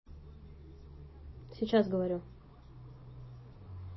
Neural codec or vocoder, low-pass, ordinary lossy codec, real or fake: none; 7.2 kHz; MP3, 24 kbps; real